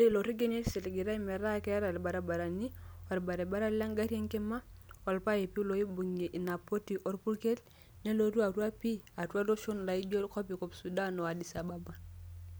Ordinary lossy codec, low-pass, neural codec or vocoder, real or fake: none; none; none; real